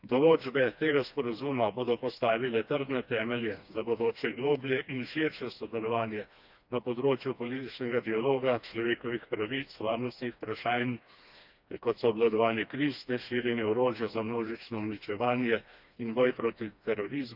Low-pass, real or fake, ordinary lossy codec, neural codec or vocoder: 5.4 kHz; fake; none; codec, 16 kHz, 2 kbps, FreqCodec, smaller model